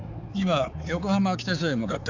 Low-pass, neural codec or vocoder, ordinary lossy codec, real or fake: 7.2 kHz; codec, 16 kHz, 4 kbps, X-Codec, HuBERT features, trained on balanced general audio; none; fake